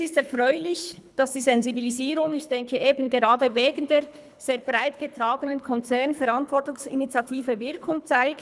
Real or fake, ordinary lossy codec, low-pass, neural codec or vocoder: fake; none; none; codec, 24 kHz, 3 kbps, HILCodec